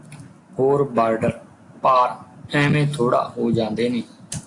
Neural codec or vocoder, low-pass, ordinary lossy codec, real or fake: none; 10.8 kHz; AAC, 48 kbps; real